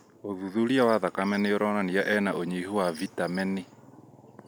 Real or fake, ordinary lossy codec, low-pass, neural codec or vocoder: real; none; none; none